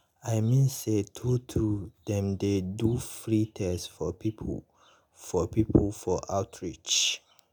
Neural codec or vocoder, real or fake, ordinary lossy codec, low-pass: none; real; none; none